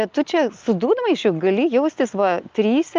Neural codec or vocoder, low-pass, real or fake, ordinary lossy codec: none; 7.2 kHz; real; Opus, 24 kbps